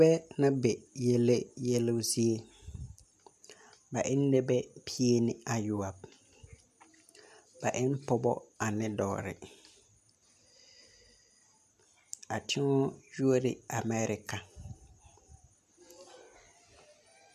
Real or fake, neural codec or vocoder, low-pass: real; none; 14.4 kHz